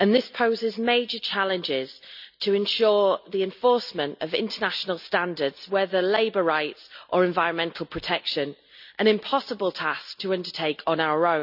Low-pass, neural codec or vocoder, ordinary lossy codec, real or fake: 5.4 kHz; none; none; real